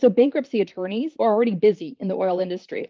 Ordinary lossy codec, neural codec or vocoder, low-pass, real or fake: Opus, 24 kbps; vocoder, 44.1 kHz, 128 mel bands, Pupu-Vocoder; 7.2 kHz; fake